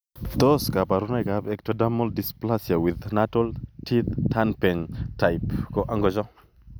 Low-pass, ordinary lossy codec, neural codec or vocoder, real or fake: none; none; none; real